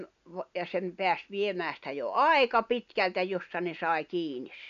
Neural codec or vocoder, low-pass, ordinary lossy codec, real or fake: none; 7.2 kHz; none; real